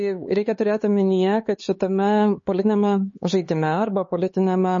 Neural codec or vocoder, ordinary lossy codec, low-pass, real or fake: codec, 16 kHz, 4 kbps, X-Codec, HuBERT features, trained on LibriSpeech; MP3, 32 kbps; 7.2 kHz; fake